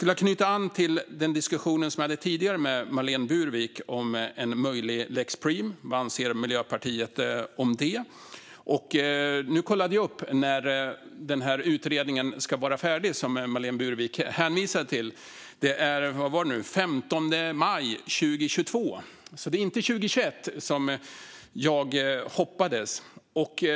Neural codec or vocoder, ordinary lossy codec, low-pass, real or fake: none; none; none; real